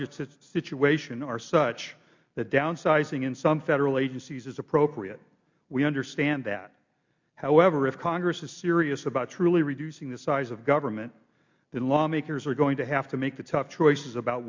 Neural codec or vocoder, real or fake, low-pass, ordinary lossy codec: none; real; 7.2 kHz; MP3, 48 kbps